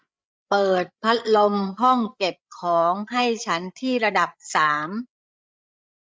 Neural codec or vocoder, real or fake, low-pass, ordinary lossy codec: codec, 16 kHz, 8 kbps, FreqCodec, larger model; fake; none; none